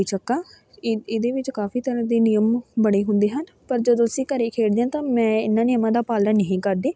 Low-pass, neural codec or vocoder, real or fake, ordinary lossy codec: none; none; real; none